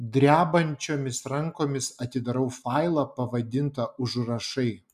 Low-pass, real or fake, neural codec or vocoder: 14.4 kHz; real; none